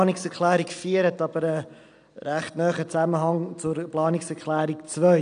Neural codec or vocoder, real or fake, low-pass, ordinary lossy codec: none; real; 9.9 kHz; none